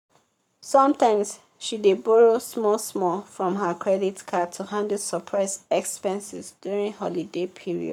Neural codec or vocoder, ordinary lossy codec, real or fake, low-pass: codec, 44.1 kHz, 7.8 kbps, Pupu-Codec; none; fake; 19.8 kHz